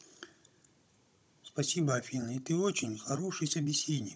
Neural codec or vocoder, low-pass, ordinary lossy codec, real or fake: codec, 16 kHz, 16 kbps, FunCodec, trained on Chinese and English, 50 frames a second; none; none; fake